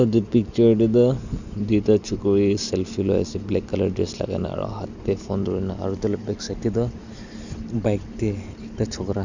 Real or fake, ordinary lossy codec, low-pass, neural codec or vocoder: real; none; 7.2 kHz; none